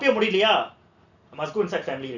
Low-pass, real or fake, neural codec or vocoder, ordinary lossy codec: 7.2 kHz; real; none; none